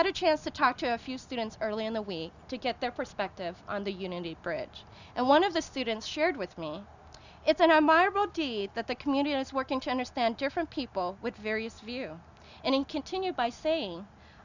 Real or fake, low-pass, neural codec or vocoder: real; 7.2 kHz; none